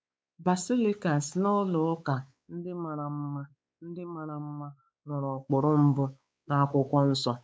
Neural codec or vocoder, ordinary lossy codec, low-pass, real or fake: codec, 16 kHz, 4 kbps, X-Codec, WavLM features, trained on Multilingual LibriSpeech; none; none; fake